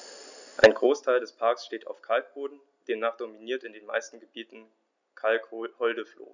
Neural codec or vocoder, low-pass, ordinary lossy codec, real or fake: none; 7.2 kHz; none; real